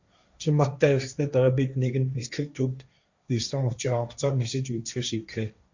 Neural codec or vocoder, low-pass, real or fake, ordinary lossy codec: codec, 16 kHz, 1.1 kbps, Voila-Tokenizer; 7.2 kHz; fake; Opus, 64 kbps